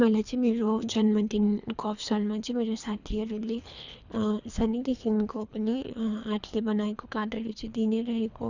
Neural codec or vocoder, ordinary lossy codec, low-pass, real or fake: codec, 24 kHz, 3 kbps, HILCodec; none; 7.2 kHz; fake